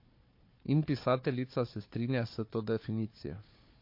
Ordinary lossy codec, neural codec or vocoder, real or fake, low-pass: MP3, 32 kbps; codec, 16 kHz, 4 kbps, FunCodec, trained on Chinese and English, 50 frames a second; fake; 5.4 kHz